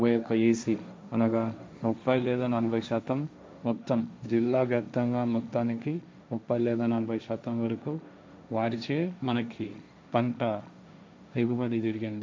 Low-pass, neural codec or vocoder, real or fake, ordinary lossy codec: none; codec, 16 kHz, 1.1 kbps, Voila-Tokenizer; fake; none